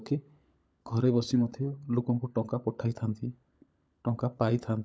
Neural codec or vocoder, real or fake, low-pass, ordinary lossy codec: codec, 16 kHz, 16 kbps, FunCodec, trained on LibriTTS, 50 frames a second; fake; none; none